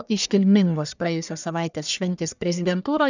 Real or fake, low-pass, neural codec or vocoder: fake; 7.2 kHz; codec, 44.1 kHz, 1.7 kbps, Pupu-Codec